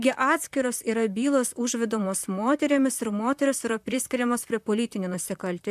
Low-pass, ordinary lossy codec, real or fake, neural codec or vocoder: 14.4 kHz; MP3, 96 kbps; fake; vocoder, 44.1 kHz, 128 mel bands, Pupu-Vocoder